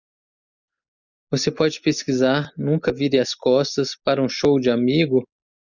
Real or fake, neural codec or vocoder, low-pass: real; none; 7.2 kHz